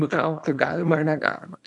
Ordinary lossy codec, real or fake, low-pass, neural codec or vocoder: AAC, 64 kbps; fake; 10.8 kHz; codec, 24 kHz, 0.9 kbps, WavTokenizer, small release